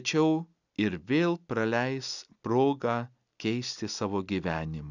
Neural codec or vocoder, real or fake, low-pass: none; real; 7.2 kHz